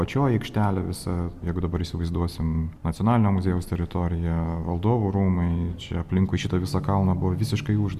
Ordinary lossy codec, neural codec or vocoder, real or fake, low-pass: Opus, 64 kbps; none; real; 14.4 kHz